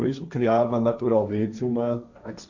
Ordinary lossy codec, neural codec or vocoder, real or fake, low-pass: none; codec, 16 kHz, 1.1 kbps, Voila-Tokenizer; fake; 7.2 kHz